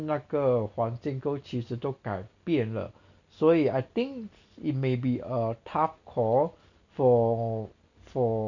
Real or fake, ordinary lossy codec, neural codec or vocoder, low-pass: real; none; none; 7.2 kHz